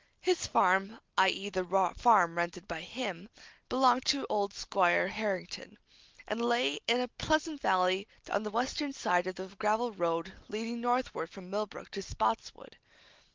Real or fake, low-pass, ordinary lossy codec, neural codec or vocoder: real; 7.2 kHz; Opus, 32 kbps; none